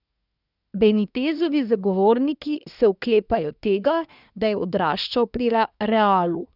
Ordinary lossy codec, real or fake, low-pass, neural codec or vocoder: none; fake; 5.4 kHz; codec, 24 kHz, 1 kbps, SNAC